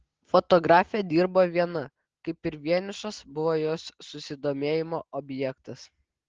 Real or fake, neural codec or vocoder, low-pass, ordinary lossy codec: real; none; 7.2 kHz; Opus, 16 kbps